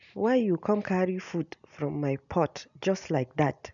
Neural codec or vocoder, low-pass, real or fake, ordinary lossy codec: none; 7.2 kHz; real; none